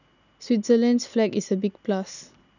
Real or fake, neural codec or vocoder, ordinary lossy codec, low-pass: real; none; none; 7.2 kHz